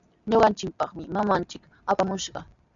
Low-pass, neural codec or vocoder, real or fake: 7.2 kHz; none; real